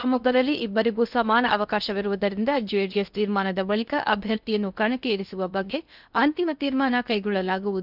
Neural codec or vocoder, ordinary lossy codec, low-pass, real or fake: codec, 16 kHz in and 24 kHz out, 0.6 kbps, FocalCodec, streaming, 2048 codes; none; 5.4 kHz; fake